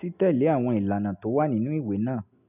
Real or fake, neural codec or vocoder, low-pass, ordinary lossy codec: real; none; 3.6 kHz; none